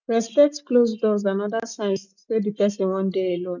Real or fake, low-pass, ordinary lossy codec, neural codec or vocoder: real; 7.2 kHz; none; none